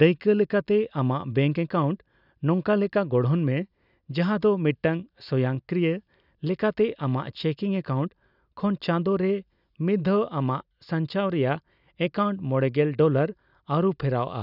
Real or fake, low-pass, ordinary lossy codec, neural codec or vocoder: real; 5.4 kHz; none; none